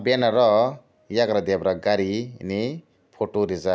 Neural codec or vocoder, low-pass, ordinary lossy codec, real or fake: none; none; none; real